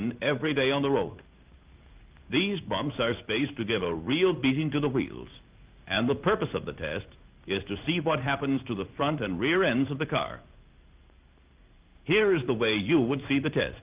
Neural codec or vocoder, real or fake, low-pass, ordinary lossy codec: codec, 16 kHz in and 24 kHz out, 1 kbps, XY-Tokenizer; fake; 3.6 kHz; Opus, 24 kbps